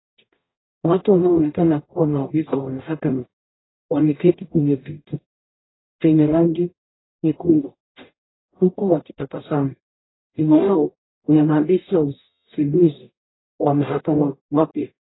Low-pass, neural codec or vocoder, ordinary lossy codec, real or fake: 7.2 kHz; codec, 44.1 kHz, 0.9 kbps, DAC; AAC, 16 kbps; fake